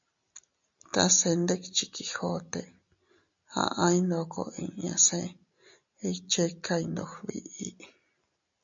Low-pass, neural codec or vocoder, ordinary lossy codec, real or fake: 7.2 kHz; none; MP3, 64 kbps; real